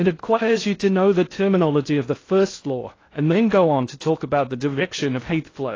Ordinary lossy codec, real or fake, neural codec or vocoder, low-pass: AAC, 32 kbps; fake; codec, 16 kHz in and 24 kHz out, 0.6 kbps, FocalCodec, streaming, 2048 codes; 7.2 kHz